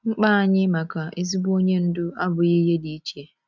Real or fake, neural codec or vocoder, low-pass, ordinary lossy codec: real; none; 7.2 kHz; none